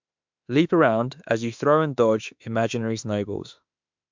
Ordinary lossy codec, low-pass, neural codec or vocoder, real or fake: MP3, 64 kbps; 7.2 kHz; autoencoder, 48 kHz, 32 numbers a frame, DAC-VAE, trained on Japanese speech; fake